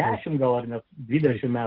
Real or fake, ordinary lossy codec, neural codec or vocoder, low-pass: real; Opus, 16 kbps; none; 5.4 kHz